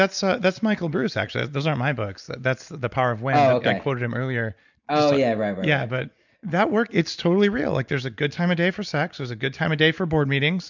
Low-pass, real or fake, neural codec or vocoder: 7.2 kHz; real; none